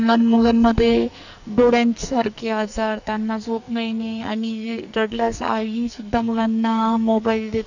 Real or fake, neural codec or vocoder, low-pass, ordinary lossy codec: fake; codec, 44.1 kHz, 2.6 kbps, SNAC; 7.2 kHz; none